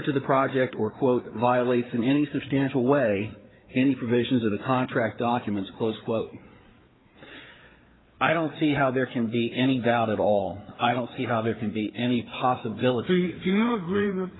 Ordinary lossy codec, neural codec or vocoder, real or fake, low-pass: AAC, 16 kbps; codec, 16 kHz, 4 kbps, FreqCodec, larger model; fake; 7.2 kHz